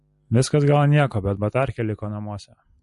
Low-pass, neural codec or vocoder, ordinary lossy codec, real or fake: 14.4 kHz; none; MP3, 48 kbps; real